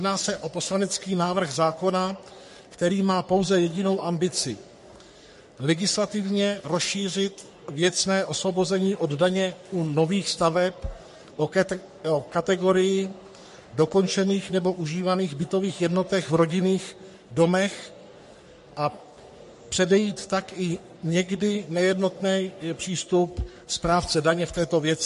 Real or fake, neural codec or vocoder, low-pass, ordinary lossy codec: fake; codec, 44.1 kHz, 3.4 kbps, Pupu-Codec; 14.4 kHz; MP3, 48 kbps